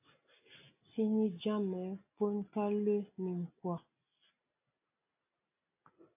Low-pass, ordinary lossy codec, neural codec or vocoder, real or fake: 3.6 kHz; AAC, 32 kbps; none; real